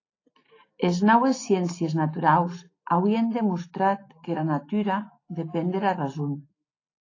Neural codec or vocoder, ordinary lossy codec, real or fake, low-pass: none; AAC, 32 kbps; real; 7.2 kHz